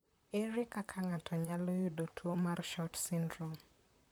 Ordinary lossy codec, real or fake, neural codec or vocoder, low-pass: none; fake; vocoder, 44.1 kHz, 128 mel bands, Pupu-Vocoder; none